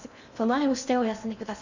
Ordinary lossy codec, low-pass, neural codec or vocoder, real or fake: none; 7.2 kHz; codec, 16 kHz in and 24 kHz out, 0.6 kbps, FocalCodec, streaming, 2048 codes; fake